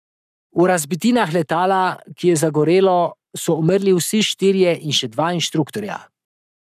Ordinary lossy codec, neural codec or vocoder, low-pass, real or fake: none; codec, 44.1 kHz, 7.8 kbps, Pupu-Codec; 14.4 kHz; fake